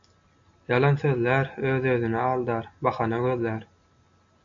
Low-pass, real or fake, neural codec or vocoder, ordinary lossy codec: 7.2 kHz; real; none; MP3, 64 kbps